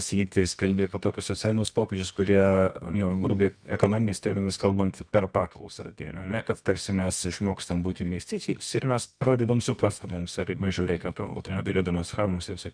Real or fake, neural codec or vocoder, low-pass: fake; codec, 24 kHz, 0.9 kbps, WavTokenizer, medium music audio release; 9.9 kHz